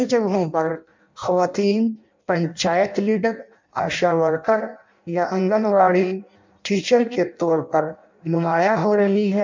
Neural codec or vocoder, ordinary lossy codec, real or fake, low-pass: codec, 16 kHz in and 24 kHz out, 0.6 kbps, FireRedTTS-2 codec; MP3, 64 kbps; fake; 7.2 kHz